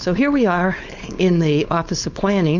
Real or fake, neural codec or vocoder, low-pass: fake; codec, 16 kHz, 4.8 kbps, FACodec; 7.2 kHz